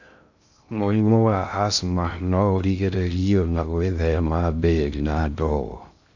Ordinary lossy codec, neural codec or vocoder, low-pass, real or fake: none; codec, 16 kHz in and 24 kHz out, 0.6 kbps, FocalCodec, streaming, 2048 codes; 7.2 kHz; fake